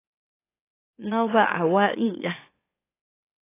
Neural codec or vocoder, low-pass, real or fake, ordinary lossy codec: autoencoder, 44.1 kHz, a latent of 192 numbers a frame, MeloTTS; 3.6 kHz; fake; AAC, 24 kbps